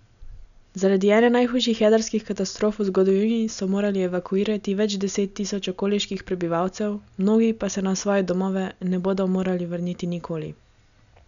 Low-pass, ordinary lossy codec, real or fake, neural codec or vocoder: 7.2 kHz; none; real; none